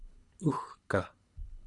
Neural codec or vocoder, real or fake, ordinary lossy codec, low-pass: codec, 24 kHz, 3 kbps, HILCodec; fake; Opus, 64 kbps; 10.8 kHz